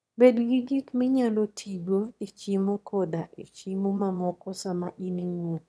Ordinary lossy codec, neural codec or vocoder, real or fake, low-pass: none; autoencoder, 22.05 kHz, a latent of 192 numbers a frame, VITS, trained on one speaker; fake; none